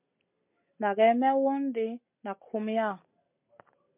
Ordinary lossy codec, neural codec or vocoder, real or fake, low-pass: MP3, 32 kbps; none; real; 3.6 kHz